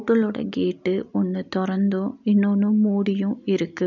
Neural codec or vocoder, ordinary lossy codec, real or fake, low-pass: none; none; real; 7.2 kHz